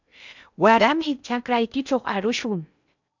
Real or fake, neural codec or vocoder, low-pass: fake; codec, 16 kHz in and 24 kHz out, 0.6 kbps, FocalCodec, streaming, 2048 codes; 7.2 kHz